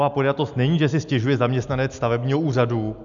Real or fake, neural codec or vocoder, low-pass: real; none; 7.2 kHz